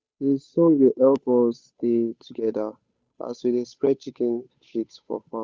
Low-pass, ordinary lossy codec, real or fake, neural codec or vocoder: none; none; fake; codec, 16 kHz, 8 kbps, FunCodec, trained on Chinese and English, 25 frames a second